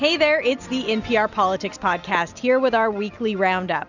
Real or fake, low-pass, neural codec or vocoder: real; 7.2 kHz; none